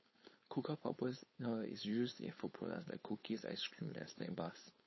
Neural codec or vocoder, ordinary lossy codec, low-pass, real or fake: codec, 16 kHz, 4.8 kbps, FACodec; MP3, 24 kbps; 7.2 kHz; fake